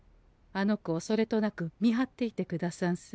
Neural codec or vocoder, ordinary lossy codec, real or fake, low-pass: none; none; real; none